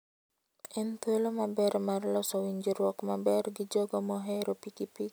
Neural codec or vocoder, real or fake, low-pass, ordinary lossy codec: none; real; none; none